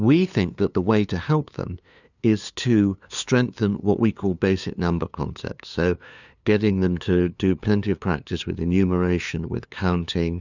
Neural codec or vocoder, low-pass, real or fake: codec, 16 kHz, 2 kbps, FunCodec, trained on LibriTTS, 25 frames a second; 7.2 kHz; fake